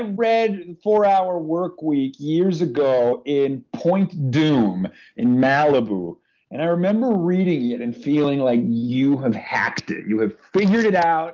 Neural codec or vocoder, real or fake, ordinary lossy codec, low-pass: none; real; Opus, 32 kbps; 7.2 kHz